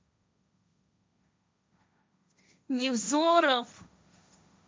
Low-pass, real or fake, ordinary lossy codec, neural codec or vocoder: none; fake; none; codec, 16 kHz, 1.1 kbps, Voila-Tokenizer